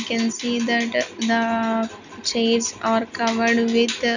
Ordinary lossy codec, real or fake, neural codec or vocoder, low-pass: none; real; none; 7.2 kHz